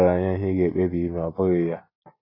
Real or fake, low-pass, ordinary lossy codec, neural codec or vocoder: real; 5.4 kHz; AAC, 24 kbps; none